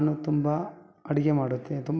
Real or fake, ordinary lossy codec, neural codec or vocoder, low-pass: real; none; none; none